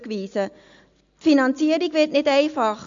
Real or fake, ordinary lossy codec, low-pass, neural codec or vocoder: real; AAC, 64 kbps; 7.2 kHz; none